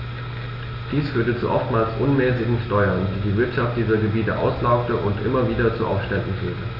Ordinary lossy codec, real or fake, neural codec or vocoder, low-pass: AAC, 48 kbps; real; none; 5.4 kHz